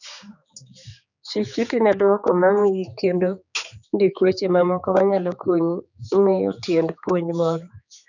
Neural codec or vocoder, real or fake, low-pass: codec, 16 kHz, 4 kbps, X-Codec, HuBERT features, trained on general audio; fake; 7.2 kHz